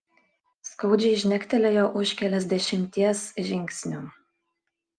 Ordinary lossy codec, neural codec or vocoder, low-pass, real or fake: Opus, 32 kbps; none; 9.9 kHz; real